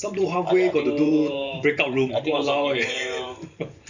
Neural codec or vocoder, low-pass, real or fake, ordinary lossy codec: vocoder, 44.1 kHz, 128 mel bands every 512 samples, BigVGAN v2; 7.2 kHz; fake; none